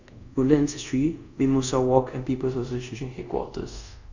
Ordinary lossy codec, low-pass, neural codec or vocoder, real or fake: none; 7.2 kHz; codec, 24 kHz, 0.5 kbps, DualCodec; fake